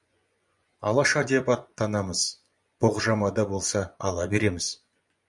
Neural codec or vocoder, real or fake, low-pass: vocoder, 24 kHz, 100 mel bands, Vocos; fake; 10.8 kHz